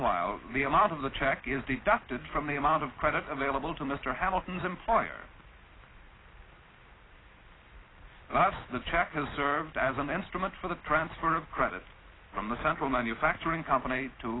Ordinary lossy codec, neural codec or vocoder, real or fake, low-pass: AAC, 16 kbps; none; real; 7.2 kHz